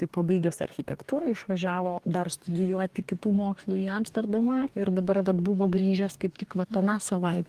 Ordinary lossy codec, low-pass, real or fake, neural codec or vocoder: Opus, 32 kbps; 14.4 kHz; fake; codec, 44.1 kHz, 2.6 kbps, DAC